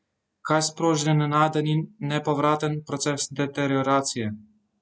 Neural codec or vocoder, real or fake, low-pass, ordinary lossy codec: none; real; none; none